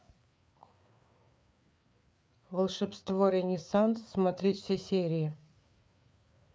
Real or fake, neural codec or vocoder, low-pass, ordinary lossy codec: fake; codec, 16 kHz, 4 kbps, FreqCodec, larger model; none; none